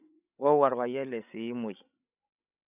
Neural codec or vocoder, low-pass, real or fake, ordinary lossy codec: codec, 16 kHz, 16 kbps, FreqCodec, larger model; 3.6 kHz; fake; none